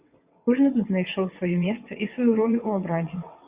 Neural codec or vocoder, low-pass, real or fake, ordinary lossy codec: vocoder, 22.05 kHz, 80 mel bands, WaveNeXt; 3.6 kHz; fake; Opus, 64 kbps